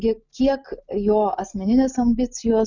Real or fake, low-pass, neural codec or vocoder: real; 7.2 kHz; none